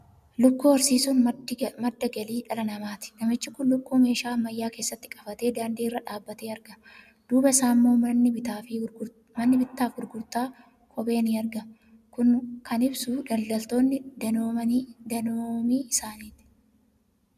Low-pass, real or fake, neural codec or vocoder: 14.4 kHz; real; none